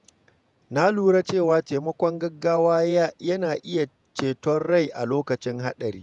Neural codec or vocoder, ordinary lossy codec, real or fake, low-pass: vocoder, 48 kHz, 128 mel bands, Vocos; none; fake; 10.8 kHz